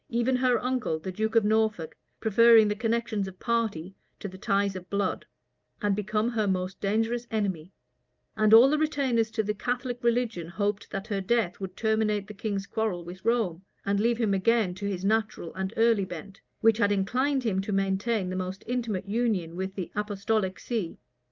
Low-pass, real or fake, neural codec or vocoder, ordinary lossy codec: 7.2 kHz; real; none; Opus, 24 kbps